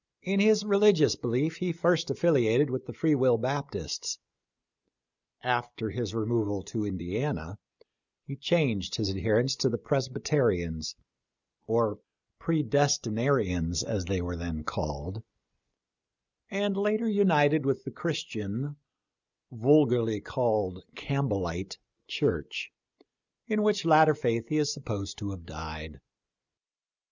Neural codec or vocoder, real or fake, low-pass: none; real; 7.2 kHz